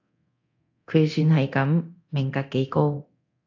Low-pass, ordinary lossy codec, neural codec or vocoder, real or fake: 7.2 kHz; AAC, 48 kbps; codec, 24 kHz, 0.9 kbps, DualCodec; fake